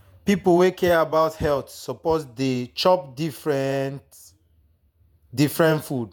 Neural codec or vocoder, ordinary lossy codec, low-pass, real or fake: vocoder, 48 kHz, 128 mel bands, Vocos; none; none; fake